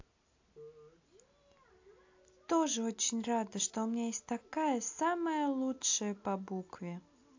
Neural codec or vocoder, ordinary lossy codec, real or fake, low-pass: none; AAC, 48 kbps; real; 7.2 kHz